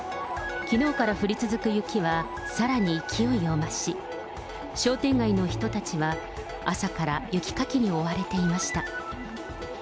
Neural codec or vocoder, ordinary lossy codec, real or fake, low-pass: none; none; real; none